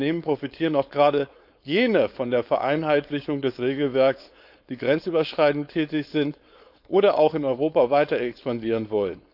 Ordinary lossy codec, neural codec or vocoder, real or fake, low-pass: none; codec, 16 kHz, 4.8 kbps, FACodec; fake; 5.4 kHz